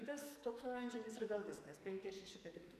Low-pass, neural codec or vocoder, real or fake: 14.4 kHz; codec, 44.1 kHz, 2.6 kbps, SNAC; fake